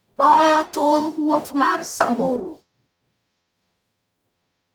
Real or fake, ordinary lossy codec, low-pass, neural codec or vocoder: fake; none; none; codec, 44.1 kHz, 0.9 kbps, DAC